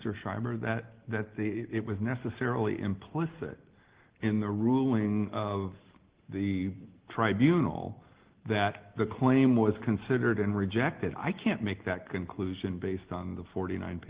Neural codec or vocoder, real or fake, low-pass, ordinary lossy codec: none; real; 3.6 kHz; Opus, 16 kbps